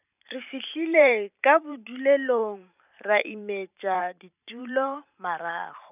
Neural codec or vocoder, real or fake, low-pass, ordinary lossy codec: vocoder, 44.1 kHz, 128 mel bands every 512 samples, BigVGAN v2; fake; 3.6 kHz; none